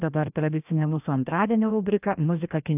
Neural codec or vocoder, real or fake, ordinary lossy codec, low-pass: codec, 16 kHz, 1 kbps, FreqCodec, larger model; fake; AAC, 32 kbps; 3.6 kHz